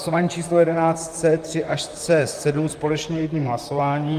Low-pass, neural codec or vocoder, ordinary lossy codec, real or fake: 14.4 kHz; vocoder, 44.1 kHz, 128 mel bands, Pupu-Vocoder; Opus, 32 kbps; fake